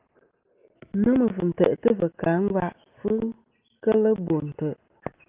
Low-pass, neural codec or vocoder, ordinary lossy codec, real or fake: 3.6 kHz; none; Opus, 24 kbps; real